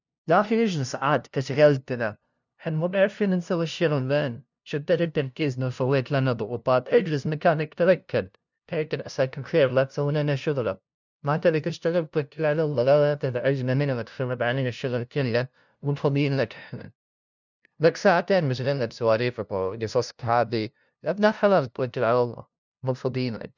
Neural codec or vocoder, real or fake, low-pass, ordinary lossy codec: codec, 16 kHz, 0.5 kbps, FunCodec, trained on LibriTTS, 25 frames a second; fake; 7.2 kHz; none